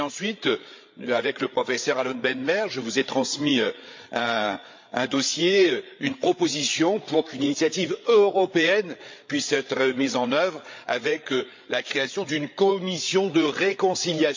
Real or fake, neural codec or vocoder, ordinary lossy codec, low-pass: fake; codec, 16 kHz, 8 kbps, FreqCodec, larger model; MP3, 48 kbps; 7.2 kHz